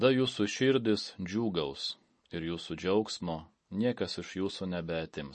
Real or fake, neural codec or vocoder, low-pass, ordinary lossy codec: real; none; 10.8 kHz; MP3, 32 kbps